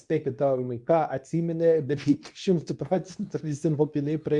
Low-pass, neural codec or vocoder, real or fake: 10.8 kHz; codec, 24 kHz, 0.9 kbps, WavTokenizer, medium speech release version 2; fake